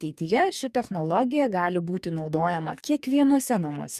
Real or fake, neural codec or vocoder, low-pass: fake; codec, 44.1 kHz, 2.6 kbps, DAC; 14.4 kHz